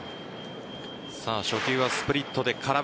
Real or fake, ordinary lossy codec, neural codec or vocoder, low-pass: real; none; none; none